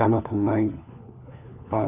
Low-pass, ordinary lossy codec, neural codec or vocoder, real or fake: 3.6 kHz; none; codec, 16 kHz, 4 kbps, FunCodec, trained on LibriTTS, 50 frames a second; fake